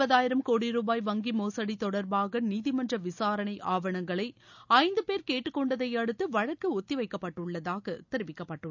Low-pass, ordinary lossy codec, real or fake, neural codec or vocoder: 7.2 kHz; none; real; none